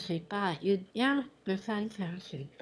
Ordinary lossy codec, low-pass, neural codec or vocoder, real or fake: none; none; autoencoder, 22.05 kHz, a latent of 192 numbers a frame, VITS, trained on one speaker; fake